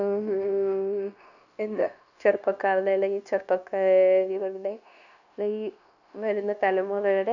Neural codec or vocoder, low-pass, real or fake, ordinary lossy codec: codec, 16 kHz, 0.9 kbps, LongCat-Audio-Codec; 7.2 kHz; fake; none